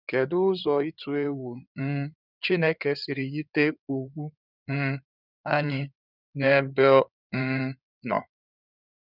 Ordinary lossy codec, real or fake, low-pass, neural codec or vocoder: none; fake; 5.4 kHz; codec, 16 kHz in and 24 kHz out, 2.2 kbps, FireRedTTS-2 codec